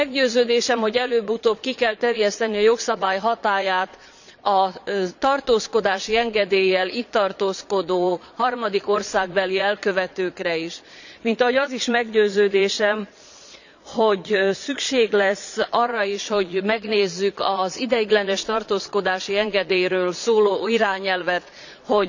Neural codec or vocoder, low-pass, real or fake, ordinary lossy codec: vocoder, 22.05 kHz, 80 mel bands, Vocos; 7.2 kHz; fake; none